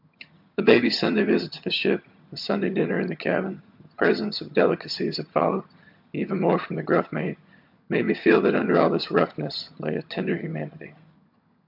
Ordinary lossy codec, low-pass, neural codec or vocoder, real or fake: MP3, 48 kbps; 5.4 kHz; vocoder, 22.05 kHz, 80 mel bands, HiFi-GAN; fake